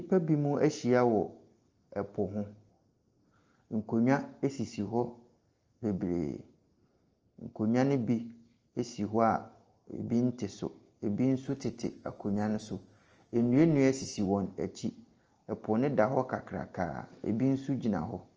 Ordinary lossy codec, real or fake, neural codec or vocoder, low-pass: Opus, 24 kbps; real; none; 7.2 kHz